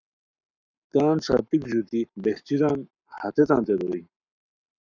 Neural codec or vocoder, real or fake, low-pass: codec, 44.1 kHz, 7.8 kbps, Pupu-Codec; fake; 7.2 kHz